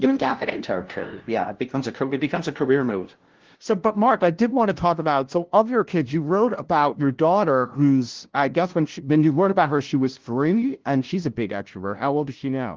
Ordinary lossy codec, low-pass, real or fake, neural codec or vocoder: Opus, 16 kbps; 7.2 kHz; fake; codec, 16 kHz, 0.5 kbps, FunCodec, trained on LibriTTS, 25 frames a second